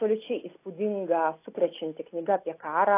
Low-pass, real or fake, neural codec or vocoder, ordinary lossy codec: 3.6 kHz; real; none; AAC, 24 kbps